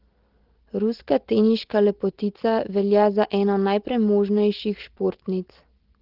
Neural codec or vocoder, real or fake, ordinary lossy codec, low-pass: none; real; Opus, 16 kbps; 5.4 kHz